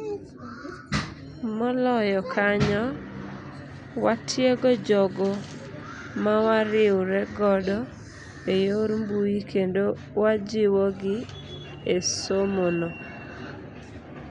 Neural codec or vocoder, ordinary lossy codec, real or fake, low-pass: none; none; real; 9.9 kHz